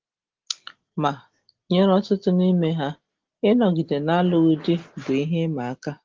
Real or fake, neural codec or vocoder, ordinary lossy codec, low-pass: real; none; Opus, 16 kbps; 7.2 kHz